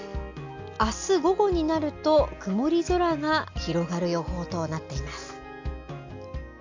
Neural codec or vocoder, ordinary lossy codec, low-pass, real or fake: none; AAC, 48 kbps; 7.2 kHz; real